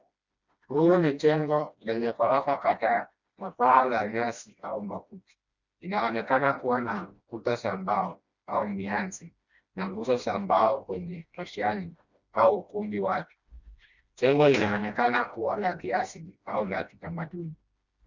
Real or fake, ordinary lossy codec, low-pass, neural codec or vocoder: fake; Opus, 64 kbps; 7.2 kHz; codec, 16 kHz, 1 kbps, FreqCodec, smaller model